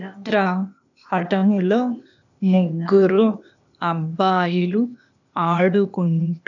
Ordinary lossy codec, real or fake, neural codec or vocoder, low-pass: none; fake; codec, 16 kHz, 0.8 kbps, ZipCodec; 7.2 kHz